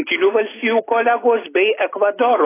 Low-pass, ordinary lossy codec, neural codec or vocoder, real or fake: 3.6 kHz; AAC, 16 kbps; none; real